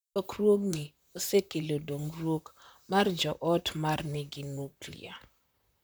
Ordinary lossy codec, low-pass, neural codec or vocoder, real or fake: none; none; vocoder, 44.1 kHz, 128 mel bands, Pupu-Vocoder; fake